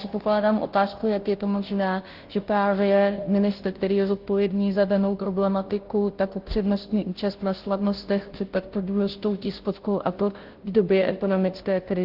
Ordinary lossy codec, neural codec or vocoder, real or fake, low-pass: Opus, 16 kbps; codec, 16 kHz, 0.5 kbps, FunCodec, trained on Chinese and English, 25 frames a second; fake; 5.4 kHz